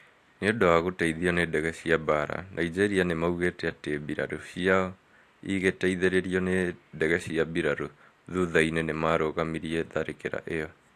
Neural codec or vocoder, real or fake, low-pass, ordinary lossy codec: none; real; 14.4 kHz; AAC, 64 kbps